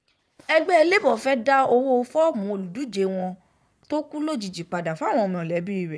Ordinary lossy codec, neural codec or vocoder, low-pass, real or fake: none; vocoder, 22.05 kHz, 80 mel bands, Vocos; none; fake